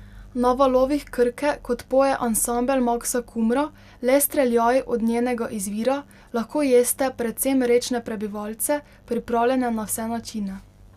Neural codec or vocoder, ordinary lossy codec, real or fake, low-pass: none; Opus, 64 kbps; real; 14.4 kHz